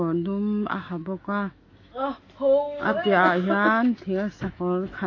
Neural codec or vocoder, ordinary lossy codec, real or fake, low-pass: autoencoder, 48 kHz, 128 numbers a frame, DAC-VAE, trained on Japanese speech; AAC, 32 kbps; fake; 7.2 kHz